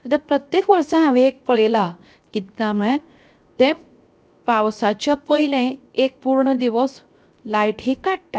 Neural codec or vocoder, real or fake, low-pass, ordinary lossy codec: codec, 16 kHz, 0.7 kbps, FocalCodec; fake; none; none